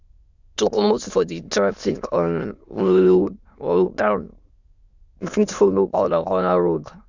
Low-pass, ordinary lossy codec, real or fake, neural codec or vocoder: 7.2 kHz; Opus, 64 kbps; fake; autoencoder, 22.05 kHz, a latent of 192 numbers a frame, VITS, trained on many speakers